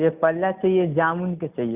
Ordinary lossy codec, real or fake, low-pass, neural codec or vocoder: Opus, 64 kbps; real; 3.6 kHz; none